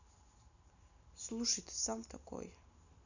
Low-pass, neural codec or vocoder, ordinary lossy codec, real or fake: 7.2 kHz; none; none; real